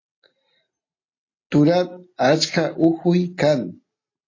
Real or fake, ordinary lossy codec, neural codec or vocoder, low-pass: real; AAC, 32 kbps; none; 7.2 kHz